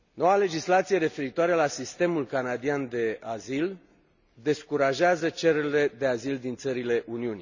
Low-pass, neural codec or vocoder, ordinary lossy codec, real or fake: 7.2 kHz; none; none; real